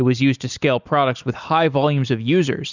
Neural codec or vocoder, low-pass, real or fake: none; 7.2 kHz; real